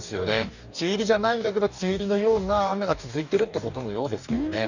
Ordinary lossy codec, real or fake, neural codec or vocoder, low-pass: none; fake; codec, 44.1 kHz, 2.6 kbps, DAC; 7.2 kHz